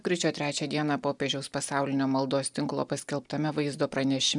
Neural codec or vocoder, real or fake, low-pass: none; real; 10.8 kHz